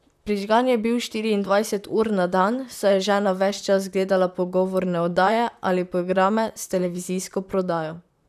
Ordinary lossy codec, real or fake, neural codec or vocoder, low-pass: none; fake; vocoder, 44.1 kHz, 128 mel bands, Pupu-Vocoder; 14.4 kHz